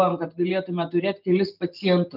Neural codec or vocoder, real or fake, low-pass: none; real; 5.4 kHz